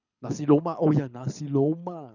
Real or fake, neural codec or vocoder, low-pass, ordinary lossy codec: fake; codec, 24 kHz, 6 kbps, HILCodec; 7.2 kHz; none